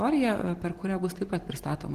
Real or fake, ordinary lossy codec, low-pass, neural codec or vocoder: real; Opus, 16 kbps; 14.4 kHz; none